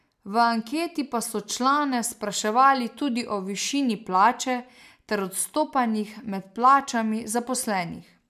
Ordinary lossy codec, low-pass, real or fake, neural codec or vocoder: MP3, 96 kbps; 14.4 kHz; real; none